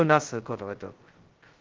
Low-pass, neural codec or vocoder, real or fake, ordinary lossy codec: 7.2 kHz; codec, 16 kHz, 0.2 kbps, FocalCodec; fake; Opus, 16 kbps